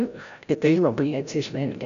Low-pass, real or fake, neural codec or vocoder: 7.2 kHz; fake; codec, 16 kHz, 0.5 kbps, FreqCodec, larger model